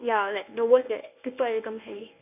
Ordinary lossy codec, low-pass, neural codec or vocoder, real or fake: none; 3.6 kHz; codec, 24 kHz, 0.9 kbps, WavTokenizer, medium speech release version 1; fake